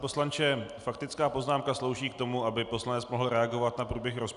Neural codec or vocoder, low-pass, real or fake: none; 10.8 kHz; real